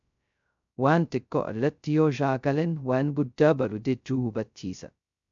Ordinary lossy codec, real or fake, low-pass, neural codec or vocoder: MP3, 64 kbps; fake; 7.2 kHz; codec, 16 kHz, 0.2 kbps, FocalCodec